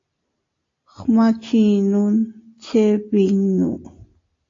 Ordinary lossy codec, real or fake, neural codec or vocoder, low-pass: AAC, 32 kbps; real; none; 7.2 kHz